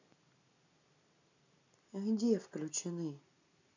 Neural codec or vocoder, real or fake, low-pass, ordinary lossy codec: none; real; 7.2 kHz; none